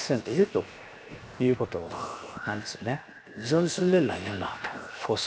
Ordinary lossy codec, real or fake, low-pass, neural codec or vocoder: none; fake; none; codec, 16 kHz, 0.7 kbps, FocalCodec